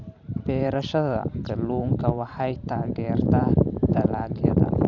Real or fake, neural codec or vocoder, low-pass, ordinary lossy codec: fake; vocoder, 44.1 kHz, 128 mel bands every 512 samples, BigVGAN v2; 7.2 kHz; none